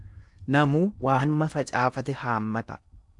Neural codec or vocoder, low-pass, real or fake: codec, 16 kHz in and 24 kHz out, 0.8 kbps, FocalCodec, streaming, 65536 codes; 10.8 kHz; fake